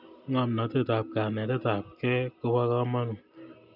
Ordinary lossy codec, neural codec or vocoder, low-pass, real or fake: none; none; 5.4 kHz; real